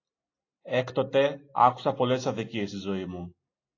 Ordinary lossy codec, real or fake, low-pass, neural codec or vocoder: AAC, 32 kbps; real; 7.2 kHz; none